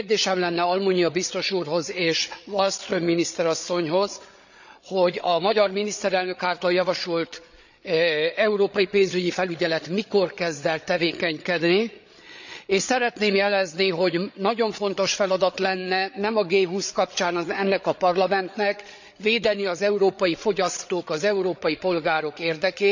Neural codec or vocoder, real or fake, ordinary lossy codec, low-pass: codec, 16 kHz, 8 kbps, FreqCodec, larger model; fake; none; 7.2 kHz